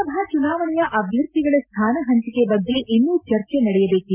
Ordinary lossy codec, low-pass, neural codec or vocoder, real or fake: MP3, 32 kbps; 3.6 kHz; none; real